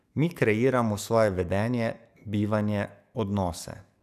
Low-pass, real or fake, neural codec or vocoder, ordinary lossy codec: 14.4 kHz; fake; codec, 44.1 kHz, 7.8 kbps, DAC; none